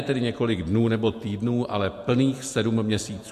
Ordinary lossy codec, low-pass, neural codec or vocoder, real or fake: MP3, 64 kbps; 14.4 kHz; vocoder, 48 kHz, 128 mel bands, Vocos; fake